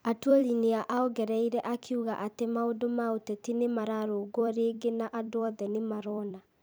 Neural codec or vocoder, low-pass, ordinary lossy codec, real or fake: vocoder, 44.1 kHz, 128 mel bands every 256 samples, BigVGAN v2; none; none; fake